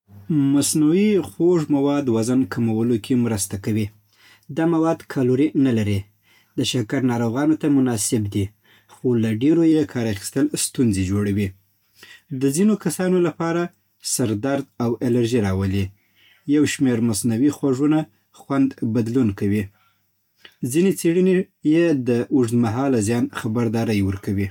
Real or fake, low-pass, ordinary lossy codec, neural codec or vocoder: real; 19.8 kHz; MP3, 96 kbps; none